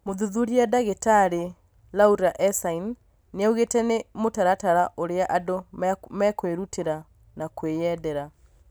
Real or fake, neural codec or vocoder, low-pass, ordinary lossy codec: real; none; none; none